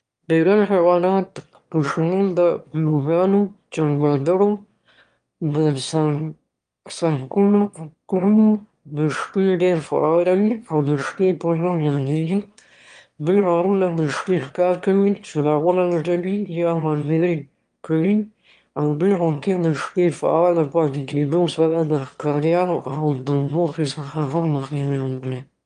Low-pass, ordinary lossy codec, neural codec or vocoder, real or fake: 9.9 kHz; Opus, 32 kbps; autoencoder, 22.05 kHz, a latent of 192 numbers a frame, VITS, trained on one speaker; fake